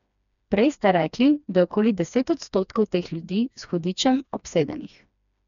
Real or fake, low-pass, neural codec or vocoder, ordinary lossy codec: fake; 7.2 kHz; codec, 16 kHz, 2 kbps, FreqCodec, smaller model; none